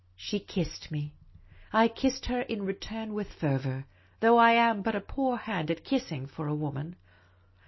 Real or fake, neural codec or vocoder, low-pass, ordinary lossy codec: real; none; 7.2 kHz; MP3, 24 kbps